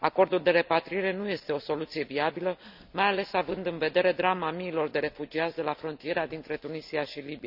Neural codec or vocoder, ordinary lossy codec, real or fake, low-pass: none; none; real; 5.4 kHz